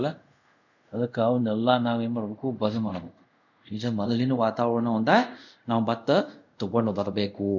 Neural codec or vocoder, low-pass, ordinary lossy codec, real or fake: codec, 24 kHz, 0.5 kbps, DualCodec; 7.2 kHz; none; fake